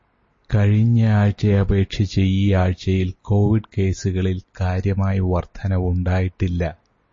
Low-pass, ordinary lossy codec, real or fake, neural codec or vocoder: 7.2 kHz; MP3, 32 kbps; real; none